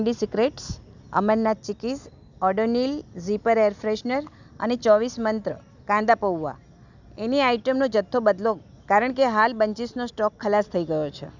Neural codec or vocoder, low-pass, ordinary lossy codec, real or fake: none; 7.2 kHz; none; real